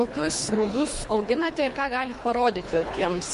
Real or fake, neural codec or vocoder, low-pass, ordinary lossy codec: fake; codec, 24 kHz, 3 kbps, HILCodec; 10.8 kHz; MP3, 48 kbps